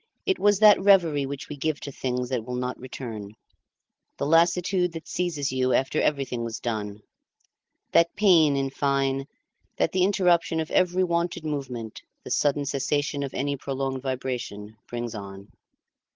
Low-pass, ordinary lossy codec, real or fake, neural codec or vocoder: 7.2 kHz; Opus, 16 kbps; real; none